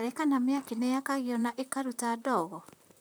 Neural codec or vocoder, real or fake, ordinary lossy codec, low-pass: vocoder, 44.1 kHz, 128 mel bands, Pupu-Vocoder; fake; none; none